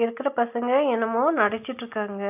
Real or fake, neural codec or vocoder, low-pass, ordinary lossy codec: real; none; 3.6 kHz; AAC, 32 kbps